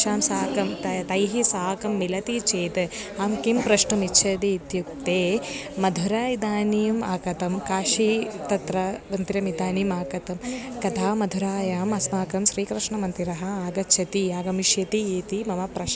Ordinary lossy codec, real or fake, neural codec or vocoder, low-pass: none; real; none; none